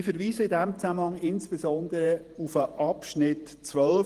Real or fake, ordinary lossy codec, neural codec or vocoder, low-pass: fake; Opus, 24 kbps; vocoder, 48 kHz, 128 mel bands, Vocos; 14.4 kHz